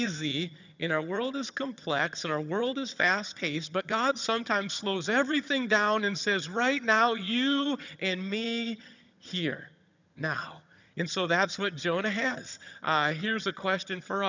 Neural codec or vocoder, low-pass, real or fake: vocoder, 22.05 kHz, 80 mel bands, HiFi-GAN; 7.2 kHz; fake